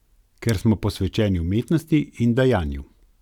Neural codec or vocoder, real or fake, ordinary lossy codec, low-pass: none; real; none; 19.8 kHz